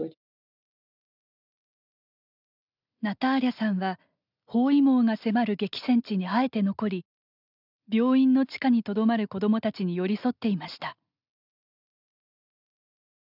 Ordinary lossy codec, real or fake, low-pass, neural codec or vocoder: none; real; 5.4 kHz; none